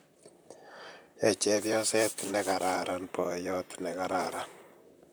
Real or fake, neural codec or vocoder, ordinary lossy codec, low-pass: fake; vocoder, 44.1 kHz, 128 mel bands, Pupu-Vocoder; none; none